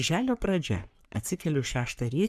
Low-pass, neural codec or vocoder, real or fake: 14.4 kHz; codec, 44.1 kHz, 3.4 kbps, Pupu-Codec; fake